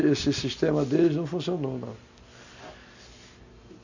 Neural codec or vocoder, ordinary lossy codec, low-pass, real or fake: none; none; 7.2 kHz; real